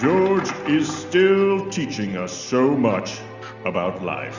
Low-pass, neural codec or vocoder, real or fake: 7.2 kHz; none; real